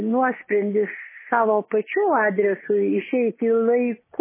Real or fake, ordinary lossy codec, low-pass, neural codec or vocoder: real; MP3, 16 kbps; 3.6 kHz; none